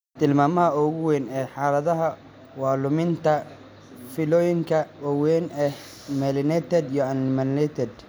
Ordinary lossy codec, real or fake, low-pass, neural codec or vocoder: none; real; none; none